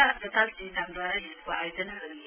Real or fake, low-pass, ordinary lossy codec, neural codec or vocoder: real; 3.6 kHz; none; none